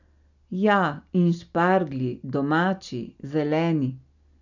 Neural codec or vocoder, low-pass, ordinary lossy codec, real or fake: none; 7.2 kHz; none; real